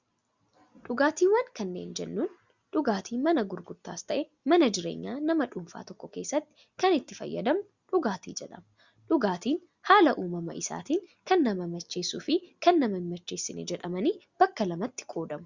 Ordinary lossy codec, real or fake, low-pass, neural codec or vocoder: Opus, 64 kbps; real; 7.2 kHz; none